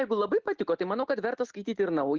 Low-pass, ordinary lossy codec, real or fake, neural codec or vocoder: 7.2 kHz; Opus, 16 kbps; real; none